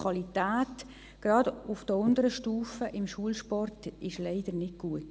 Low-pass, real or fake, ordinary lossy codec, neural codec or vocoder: none; real; none; none